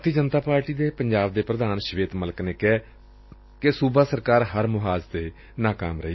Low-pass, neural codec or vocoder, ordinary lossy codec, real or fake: 7.2 kHz; none; MP3, 24 kbps; real